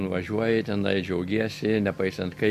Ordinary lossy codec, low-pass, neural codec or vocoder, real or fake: MP3, 64 kbps; 14.4 kHz; none; real